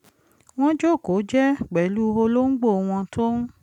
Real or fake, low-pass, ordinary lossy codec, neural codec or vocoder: real; 19.8 kHz; none; none